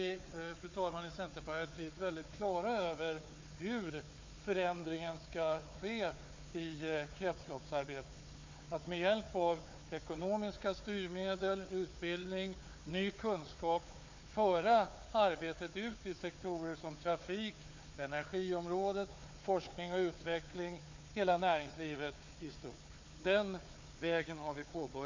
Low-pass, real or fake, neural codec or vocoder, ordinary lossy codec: 7.2 kHz; fake; codec, 16 kHz, 4 kbps, FunCodec, trained on Chinese and English, 50 frames a second; MP3, 48 kbps